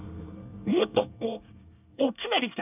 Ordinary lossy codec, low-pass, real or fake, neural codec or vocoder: none; 3.6 kHz; fake; codec, 24 kHz, 1 kbps, SNAC